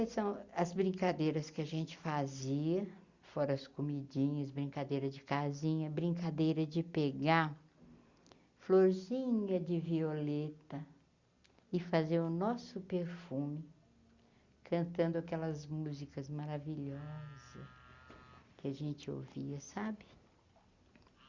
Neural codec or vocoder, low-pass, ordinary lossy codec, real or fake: none; 7.2 kHz; Opus, 64 kbps; real